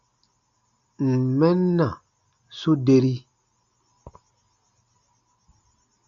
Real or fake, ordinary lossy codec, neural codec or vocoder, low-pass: real; AAC, 64 kbps; none; 7.2 kHz